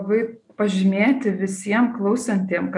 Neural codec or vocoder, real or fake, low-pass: none; real; 10.8 kHz